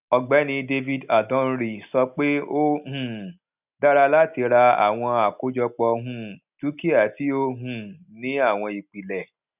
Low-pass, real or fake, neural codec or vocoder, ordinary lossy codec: 3.6 kHz; real; none; none